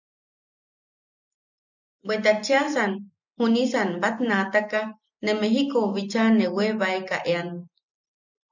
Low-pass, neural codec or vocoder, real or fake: 7.2 kHz; none; real